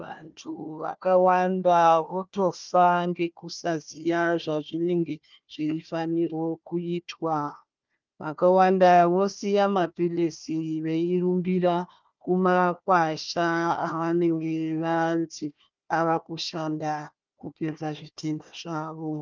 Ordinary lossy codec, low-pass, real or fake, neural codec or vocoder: Opus, 24 kbps; 7.2 kHz; fake; codec, 16 kHz, 1 kbps, FunCodec, trained on Chinese and English, 50 frames a second